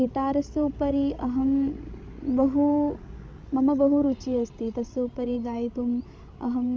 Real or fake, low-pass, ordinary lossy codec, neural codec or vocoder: fake; none; none; codec, 16 kHz, 16 kbps, FreqCodec, larger model